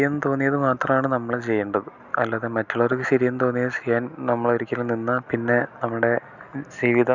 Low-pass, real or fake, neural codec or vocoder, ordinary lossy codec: 7.2 kHz; real; none; none